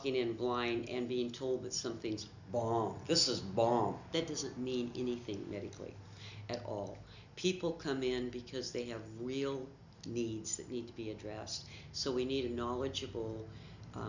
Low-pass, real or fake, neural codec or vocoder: 7.2 kHz; real; none